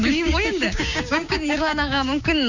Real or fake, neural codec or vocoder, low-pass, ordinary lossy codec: fake; codec, 16 kHz, 6 kbps, DAC; 7.2 kHz; none